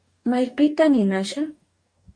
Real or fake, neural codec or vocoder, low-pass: fake; codec, 44.1 kHz, 2.6 kbps, DAC; 9.9 kHz